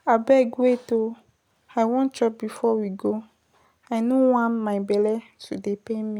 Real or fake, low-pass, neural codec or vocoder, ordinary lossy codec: real; 19.8 kHz; none; none